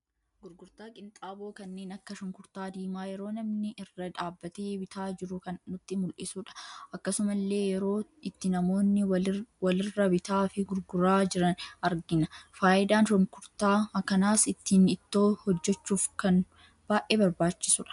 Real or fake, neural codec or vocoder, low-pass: real; none; 10.8 kHz